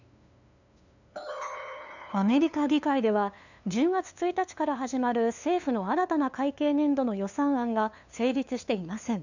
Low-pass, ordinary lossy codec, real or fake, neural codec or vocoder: 7.2 kHz; none; fake; codec, 16 kHz, 2 kbps, FunCodec, trained on LibriTTS, 25 frames a second